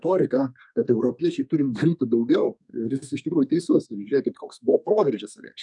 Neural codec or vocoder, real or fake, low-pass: codec, 32 kHz, 1.9 kbps, SNAC; fake; 10.8 kHz